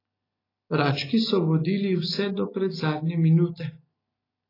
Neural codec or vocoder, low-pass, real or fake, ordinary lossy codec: none; 5.4 kHz; real; AAC, 24 kbps